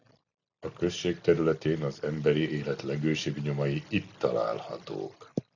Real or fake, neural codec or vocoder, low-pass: real; none; 7.2 kHz